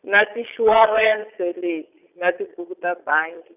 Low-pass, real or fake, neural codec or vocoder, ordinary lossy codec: 3.6 kHz; fake; vocoder, 22.05 kHz, 80 mel bands, Vocos; none